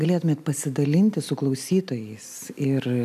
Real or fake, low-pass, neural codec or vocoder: real; 14.4 kHz; none